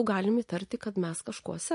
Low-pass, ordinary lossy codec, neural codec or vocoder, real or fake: 10.8 kHz; MP3, 48 kbps; none; real